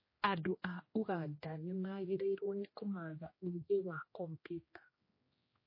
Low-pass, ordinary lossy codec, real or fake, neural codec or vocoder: 5.4 kHz; MP3, 24 kbps; fake; codec, 16 kHz, 1 kbps, X-Codec, HuBERT features, trained on general audio